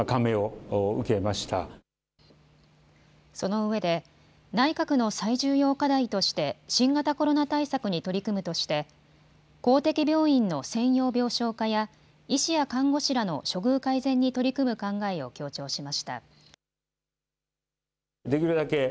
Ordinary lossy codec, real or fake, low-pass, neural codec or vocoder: none; real; none; none